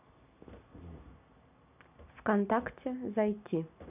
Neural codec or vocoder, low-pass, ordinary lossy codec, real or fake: none; 3.6 kHz; none; real